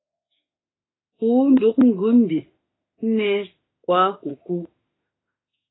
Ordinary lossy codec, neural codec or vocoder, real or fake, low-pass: AAC, 16 kbps; autoencoder, 48 kHz, 32 numbers a frame, DAC-VAE, trained on Japanese speech; fake; 7.2 kHz